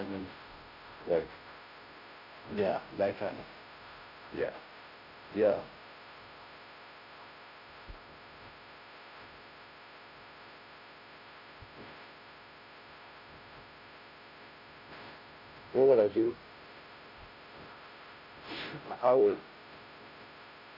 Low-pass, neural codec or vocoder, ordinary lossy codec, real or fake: 5.4 kHz; codec, 16 kHz, 0.5 kbps, FunCodec, trained on Chinese and English, 25 frames a second; none; fake